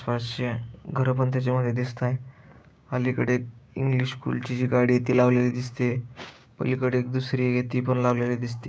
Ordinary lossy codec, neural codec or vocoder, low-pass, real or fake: none; codec, 16 kHz, 6 kbps, DAC; none; fake